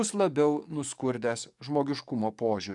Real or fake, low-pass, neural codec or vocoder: fake; 10.8 kHz; codec, 44.1 kHz, 7.8 kbps, DAC